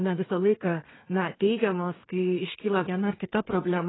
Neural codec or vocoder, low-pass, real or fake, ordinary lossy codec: codec, 32 kHz, 1.9 kbps, SNAC; 7.2 kHz; fake; AAC, 16 kbps